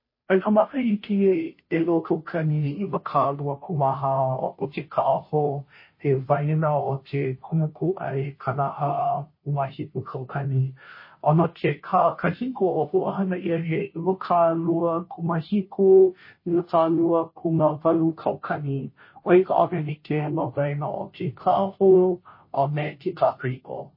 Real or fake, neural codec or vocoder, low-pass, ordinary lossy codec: fake; codec, 16 kHz, 0.5 kbps, FunCodec, trained on Chinese and English, 25 frames a second; 5.4 kHz; MP3, 24 kbps